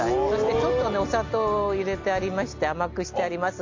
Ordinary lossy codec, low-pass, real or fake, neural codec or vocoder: MP3, 64 kbps; 7.2 kHz; real; none